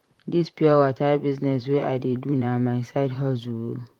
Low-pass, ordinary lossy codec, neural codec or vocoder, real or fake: 19.8 kHz; Opus, 24 kbps; vocoder, 44.1 kHz, 128 mel bands, Pupu-Vocoder; fake